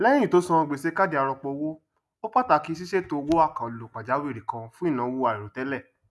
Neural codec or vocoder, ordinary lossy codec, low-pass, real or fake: none; none; none; real